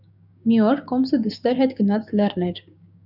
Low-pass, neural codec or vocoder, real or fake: 5.4 kHz; codec, 16 kHz, 6 kbps, DAC; fake